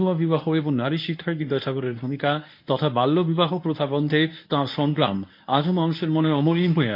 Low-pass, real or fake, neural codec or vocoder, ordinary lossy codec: 5.4 kHz; fake; codec, 24 kHz, 0.9 kbps, WavTokenizer, medium speech release version 1; MP3, 32 kbps